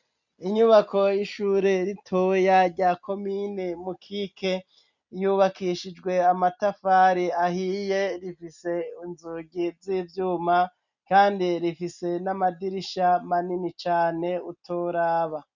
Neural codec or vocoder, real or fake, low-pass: none; real; 7.2 kHz